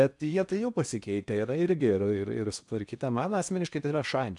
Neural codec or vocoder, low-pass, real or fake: codec, 16 kHz in and 24 kHz out, 0.8 kbps, FocalCodec, streaming, 65536 codes; 10.8 kHz; fake